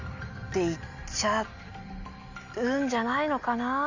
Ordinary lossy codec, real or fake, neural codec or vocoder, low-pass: none; real; none; 7.2 kHz